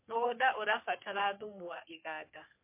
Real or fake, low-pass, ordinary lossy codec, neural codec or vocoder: fake; 3.6 kHz; MP3, 24 kbps; codec, 44.1 kHz, 3.4 kbps, Pupu-Codec